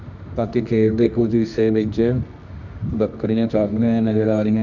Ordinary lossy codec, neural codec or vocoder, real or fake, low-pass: none; codec, 24 kHz, 0.9 kbps, WavTokenizer, medium music audio release; fake; 7.2 kHz